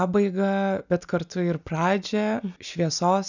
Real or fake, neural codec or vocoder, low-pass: real; none; 7.2 kHz